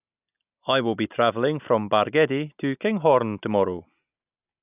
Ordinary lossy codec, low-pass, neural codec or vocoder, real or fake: none; 3.6 kHz; none; real